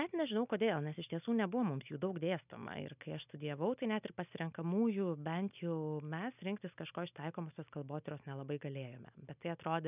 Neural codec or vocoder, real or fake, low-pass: none; real; 3.6 kHz